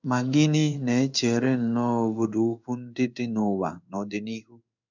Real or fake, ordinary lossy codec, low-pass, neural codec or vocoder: fake; none; 7.2 kHz; codec, 16 kHz in and 24 kHz out, 1 kbps, XY-Tokenizer